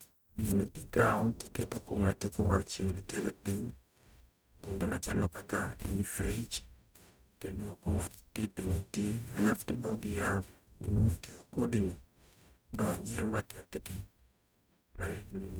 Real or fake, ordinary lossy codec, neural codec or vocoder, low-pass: fake; none; codec, 44.1 kHz, 0.9 kbps, DAC; none